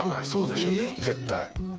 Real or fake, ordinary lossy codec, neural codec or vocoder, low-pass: fake; none; codec, 16 kHz, 4 kbps, FreqCodec, smaller model; none